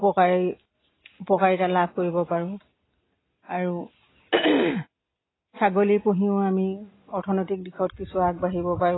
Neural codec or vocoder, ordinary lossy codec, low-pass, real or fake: none; AAC, 16 kbps; 7.2 kHz; real